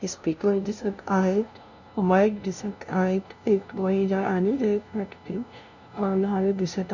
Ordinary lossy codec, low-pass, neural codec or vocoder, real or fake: none; 7.2 kHz; codec, 16 kHz, 0.5 kbps, FunCodec, trained on LibriTTS, 25 frames a second; fake